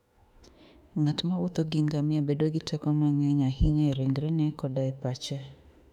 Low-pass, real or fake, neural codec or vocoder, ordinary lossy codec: 19.8 kHz; fake; autoencoder, 48 kHz, 32 numbers a frame, DAC-VAE, trained on Japanese speech; none